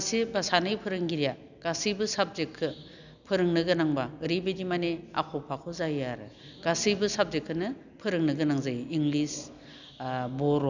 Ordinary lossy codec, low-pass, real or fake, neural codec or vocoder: none; 7.2 kHz; real; none